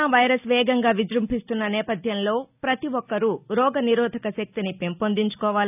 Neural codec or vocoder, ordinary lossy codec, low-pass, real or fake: none; none; 3.6 kHz; real